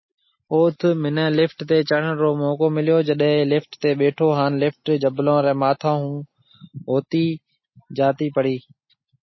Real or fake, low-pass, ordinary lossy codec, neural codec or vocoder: real; 7.2 kHz; MP3, 24 kbps; none